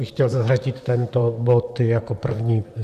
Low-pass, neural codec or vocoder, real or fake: 14.4 kHz; vocoder, 44.1 kHz, 128 mel bands, Pupu-Vocoder; fake